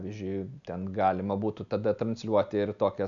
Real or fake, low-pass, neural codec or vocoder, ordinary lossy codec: real; 7.2 kHz; none; MP3, 96 kbps